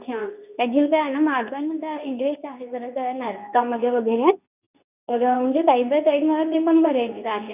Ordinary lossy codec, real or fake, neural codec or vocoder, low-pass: none; fake; codec, 24 kHz, 0.9 kbps, WavTokenizer, medium speech release version 2; 3.6 kHz